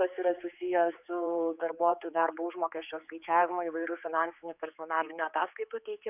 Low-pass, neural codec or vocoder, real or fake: 3.6 kHz; codec, 16 kHz, 4 kbps, X-Codec, HuBERT features, trained on general audio; fake